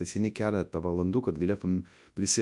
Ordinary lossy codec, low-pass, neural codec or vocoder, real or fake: MP3, 64 kbps; 10.8 kHz; codec, 24 kHz, 0.9 kbps, WavTokenizer, large speech release; fake